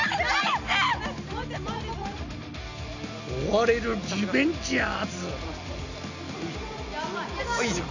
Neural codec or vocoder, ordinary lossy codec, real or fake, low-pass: none; none; real; 7.2 kHz